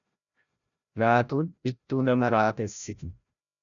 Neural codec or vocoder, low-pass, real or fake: codec, 16 kHz, 0.5 kbps, FreqCodec, larger model; 7.2 kHz; fake